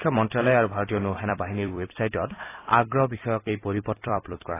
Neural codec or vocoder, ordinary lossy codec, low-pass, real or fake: vocoder, 44.1 kHz, 128 mel bands every 256 samples, BigVGAN v2; AAC, 16 kbps; 3.6 kHz; fake